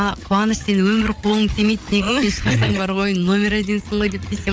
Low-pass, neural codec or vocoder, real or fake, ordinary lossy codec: none; codec, 16 kHz, 8 kbps, FreqCodec, larger model; fake; none